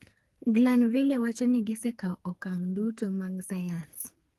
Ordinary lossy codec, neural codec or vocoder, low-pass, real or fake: Opus, 24 kbps; codec, 44.1 kHz, 2.6 kbps, SNAC; 14.4 kHz; fake